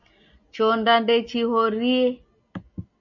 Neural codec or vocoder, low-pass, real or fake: none; 7.2 kHz; real